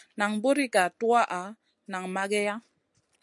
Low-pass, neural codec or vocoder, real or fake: 10.8 kHz; none; real